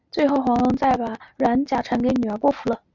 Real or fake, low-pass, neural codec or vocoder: real; 7.2 kHz; none